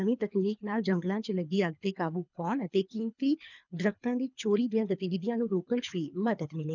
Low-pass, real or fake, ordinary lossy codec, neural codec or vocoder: 7.2 kHz; fake; none; codec, 24 kHz, 3 kbps, HILCodec